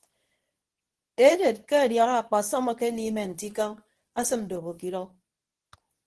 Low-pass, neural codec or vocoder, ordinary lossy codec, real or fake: 10.8 kHz; codec, 24 kHz, 0.9 kbps, WavTokenizer, medium speech release version 2; Opus, 16 kbps; fake